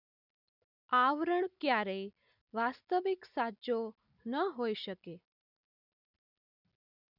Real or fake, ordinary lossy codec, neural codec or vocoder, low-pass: real; none; none; 5.4 kHz